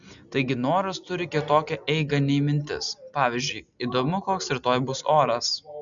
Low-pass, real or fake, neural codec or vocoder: 7.2 kHz; real; none